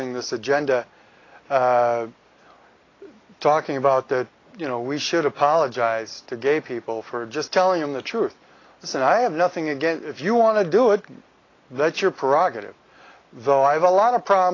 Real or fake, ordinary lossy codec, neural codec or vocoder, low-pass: real; AAC, 32 kbps; none; 7.2 kHz